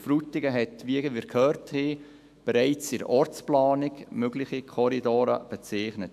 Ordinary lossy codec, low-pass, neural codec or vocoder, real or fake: AAC, 96 kbps; 14.4 kHz; autoencoder, 48 kHz, 128 numbers a frame, DAC-VAE, trained on Japanese speech; fake